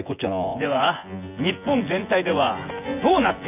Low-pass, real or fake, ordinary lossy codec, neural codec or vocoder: 3.6 kHz; fake; none; vocoder, 24 kHz, 100 mel bands, Vocos